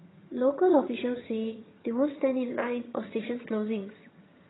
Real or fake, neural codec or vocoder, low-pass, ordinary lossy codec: fake; vocoder, 22.05 kHz, 80 mel bands, HiFi-GAN; 7.2 kHz; AAC, 16 kbps